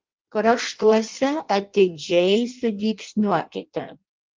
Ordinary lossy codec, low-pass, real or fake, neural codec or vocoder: Opus, 32 kbps; 7.2 kHz; fake; codec, 16 kHz in and 24 kHz out, 0.6 kbps, FireRedTTS-2 codec